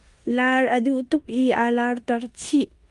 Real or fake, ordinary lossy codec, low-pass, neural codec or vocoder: fake; Opus, 32 kbps; 10.8 kHz; codec, 16 kHz in and 24 kHz out, 0.9 kbps, LongCat-Audio-Codec, four codebook decoder